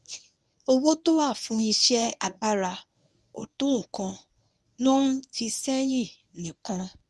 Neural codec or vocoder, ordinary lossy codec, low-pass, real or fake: codec, 24 kHz, 0.9 kbps, WavTokenizer, medium speech release version 1; none; none; fake